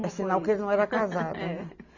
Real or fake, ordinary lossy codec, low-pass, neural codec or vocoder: real; none; 7.2 kHz; none